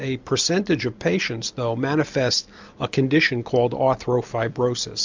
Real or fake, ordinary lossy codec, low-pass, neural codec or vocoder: real; MP3, 64 kbps; 7.2 kHz; none